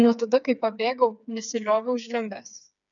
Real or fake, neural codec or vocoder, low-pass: fake; codec, 16 kHz, 4 kbps, FreqCodec, smaller model; 7.2 kHz